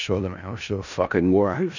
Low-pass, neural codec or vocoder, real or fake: 7.2 kHz; codec, 16 kHz in and 24 kHz out, 0.4 kbps, LongCat-Audio-Codec, four codebook decoder; fake